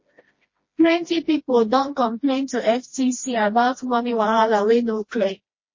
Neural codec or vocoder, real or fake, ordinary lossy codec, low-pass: codec, 16 kHz, 1 kbps, FreqCodec, smaller model; fake; MP3, 32 kbps; 7.2 kHz